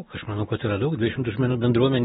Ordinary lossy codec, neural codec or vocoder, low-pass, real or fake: AAC, 16 kbps; none; 10.8 kHz; real